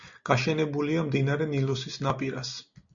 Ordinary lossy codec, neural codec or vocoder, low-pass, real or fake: MP3, 64 kbps; none; 7.2 kHz; real